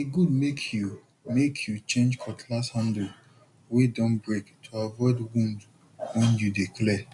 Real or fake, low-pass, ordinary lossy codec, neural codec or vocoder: real; 10.8 kHz; none; none